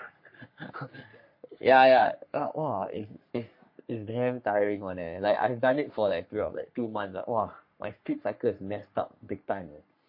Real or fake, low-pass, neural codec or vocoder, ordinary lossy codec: fake; 5.4 kHz; codec, 44.1 kHz, 3.4 kbps, Pupu-Codec; MP3, 32 kbps